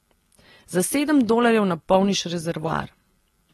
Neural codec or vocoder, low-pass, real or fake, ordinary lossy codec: none; 19.8 kHz; real; AAC, 32 kbps